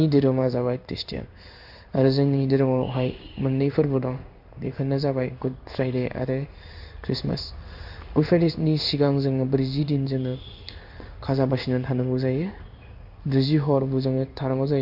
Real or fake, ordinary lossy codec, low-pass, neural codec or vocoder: fake; none; 5.4 kHz; codec, 16 kHz in and 24 kHz out, 1 kbps, XY-Tokenizer